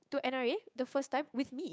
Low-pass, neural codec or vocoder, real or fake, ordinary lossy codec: none; codec, 16 kHz, 6 kbps, DAC; fake; none